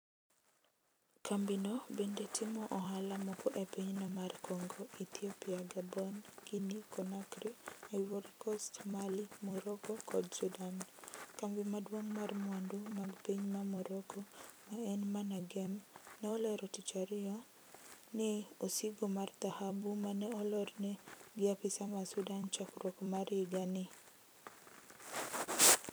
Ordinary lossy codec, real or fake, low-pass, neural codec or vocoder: none; fake; none; vocoder, 44.1 kHz, 128 mel bands every 256 samples, BigVGAN v2